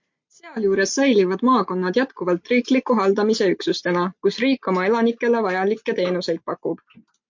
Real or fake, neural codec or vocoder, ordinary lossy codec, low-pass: real; none; MP3, 48 kbps; 7.2 kHz